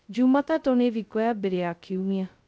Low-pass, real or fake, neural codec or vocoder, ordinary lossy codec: none; fake; codec, 16 kHz, 0.2 kbps, FocalCodec; none